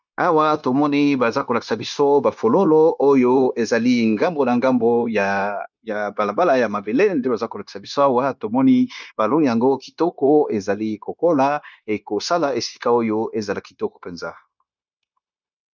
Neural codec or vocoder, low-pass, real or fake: codec, 16 kHz, 0.9 kbps, LongCat-Audio-Codec; 7.2 kHz; fake